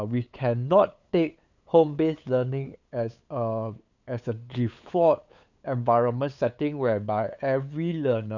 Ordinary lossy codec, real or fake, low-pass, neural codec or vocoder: none; fake; 7.2 kHz; codec, 16 kHz, 8 kbps, FunCodec, trained on LibriTTS, 25 frames a second